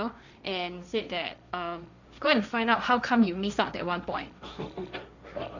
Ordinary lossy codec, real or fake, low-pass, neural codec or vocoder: none; fake; 7.2 kHz; codec, 16 kHz, 1.1 kbps, Voila-Tokenizer